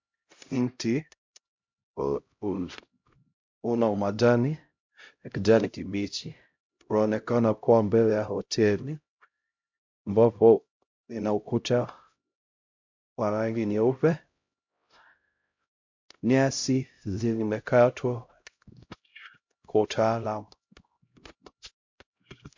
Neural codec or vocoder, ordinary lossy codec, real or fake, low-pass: codec, 16 kHz, 0.5 kbps, X-Codec, HuBERT features, trained on LibriSpeech; MP3, 48 kbps; fake; 7.2 kHz